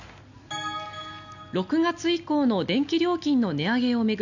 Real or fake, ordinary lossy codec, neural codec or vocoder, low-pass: real; none; none; 7.2 kHz